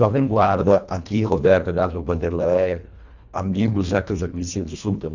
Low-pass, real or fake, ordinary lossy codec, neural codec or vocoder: 7.2 kHz; fake; none; codec, 24 kHz, 1.5 kbps, HILCodec